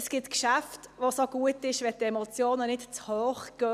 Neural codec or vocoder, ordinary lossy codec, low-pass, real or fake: none; none; 14.4 kHz; real